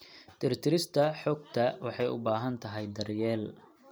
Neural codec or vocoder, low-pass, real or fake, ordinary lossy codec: none; none; real; none